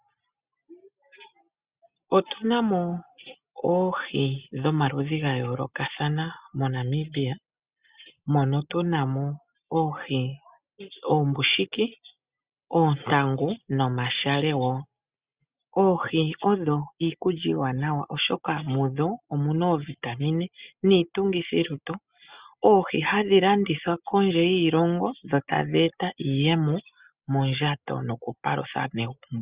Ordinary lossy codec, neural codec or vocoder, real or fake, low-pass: Opus, 64 kbps; none; real; 3.6 kHz